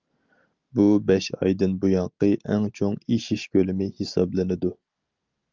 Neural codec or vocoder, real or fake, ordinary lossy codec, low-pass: none; real; Opus, 32 kbps; 7.2 kHz